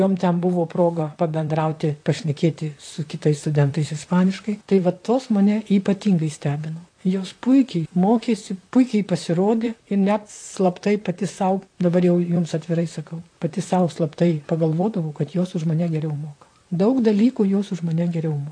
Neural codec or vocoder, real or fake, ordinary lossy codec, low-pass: vocoder, 44.1 kHz, 128 mel bands, Pupu-Vocoder; fake; AAC, 48 kbps; 9.9 kHz